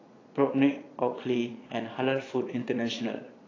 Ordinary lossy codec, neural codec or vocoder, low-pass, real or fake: AAC, 32 kbps; vocoder, 22.05 kHz, 80 mel bands, Vocos; 7.2 kHz; fake